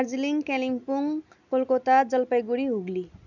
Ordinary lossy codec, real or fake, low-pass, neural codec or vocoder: none; real; 7.2 kHz; none